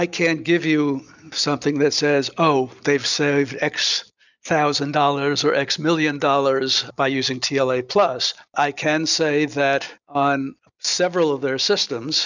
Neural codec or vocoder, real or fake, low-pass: none; real; 7.2 kHz